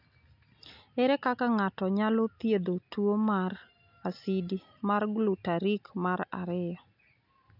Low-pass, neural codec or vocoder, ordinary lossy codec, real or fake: 5.4 kHz; none; none; real